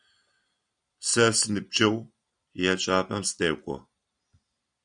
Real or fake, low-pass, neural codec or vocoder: real; 9.9 kHz; none